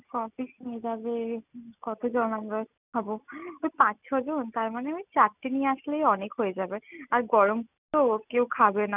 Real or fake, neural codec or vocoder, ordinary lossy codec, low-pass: real; none; none; 3.6 kHz